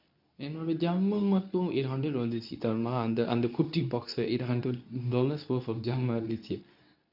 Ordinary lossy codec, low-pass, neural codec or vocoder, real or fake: none; 5.4 kHz; codec, 24 kHz, 0.9 kbps, WavTokenizer, medium speech release version 2; fake